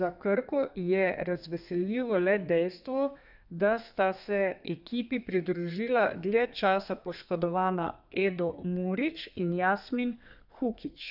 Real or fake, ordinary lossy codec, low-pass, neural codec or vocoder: fake; none; 5.4 kHz; codec, 32 kHz, 1.9 kbps, SNAC